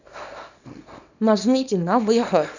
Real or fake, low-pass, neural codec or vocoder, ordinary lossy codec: fake; 7.2 kHz; codec, 24 kHz, 0.9 kbps, WavTokenizer, small release; none